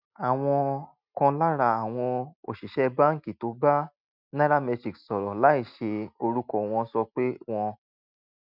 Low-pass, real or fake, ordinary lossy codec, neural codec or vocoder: 5.4 kHz; real; none; none